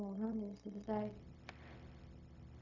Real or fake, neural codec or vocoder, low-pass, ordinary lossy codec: fake; codec, 16 kHz, 0.4 kbps, LongCat-Audio-Codec; 7.2 kHz; MP3, 48 kbps